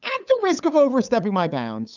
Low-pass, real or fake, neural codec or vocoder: 7.2 kHz; fake; codec, 16 kHz, 8 kbps, FunCodec, trained on LibriTTS, 25 frames a second